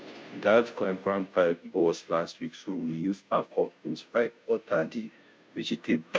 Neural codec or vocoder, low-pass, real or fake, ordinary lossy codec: codec, 16 kHz, 0.5 kbps, FunCodec, trained on Chinese and English, 25 frames a second; none; fake; none